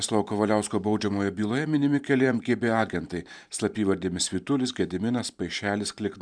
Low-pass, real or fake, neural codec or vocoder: 9.9 kHz; fake; vocoder, 44.1 kHz, 128 mel bands every 512 samples, BigVGAN v2